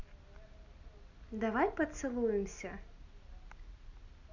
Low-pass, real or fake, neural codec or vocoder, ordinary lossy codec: 7.2 kHz; real; none; none